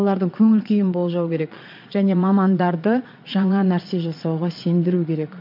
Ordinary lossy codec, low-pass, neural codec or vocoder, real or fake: MP3, 48 kbps; 5.4 kHz; vocoder, 22.05 kHz, 80 mel bands, Vocos; fake